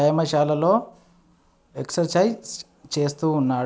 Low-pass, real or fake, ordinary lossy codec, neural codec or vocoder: none; real; none; none